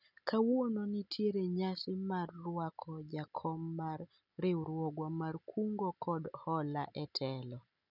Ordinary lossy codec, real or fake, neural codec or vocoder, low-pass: none; real; none; 5.4 kHz